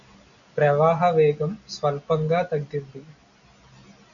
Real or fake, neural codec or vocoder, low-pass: real; none; 7.2 kHz